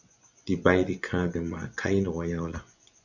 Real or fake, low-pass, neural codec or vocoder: fake; 7.2 kHz; vocoder, 24 kHz, 100 mel bands, Vocos